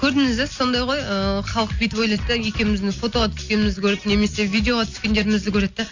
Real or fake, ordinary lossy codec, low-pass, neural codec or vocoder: real; AAC, 48 kbps; 7.2 kHz; none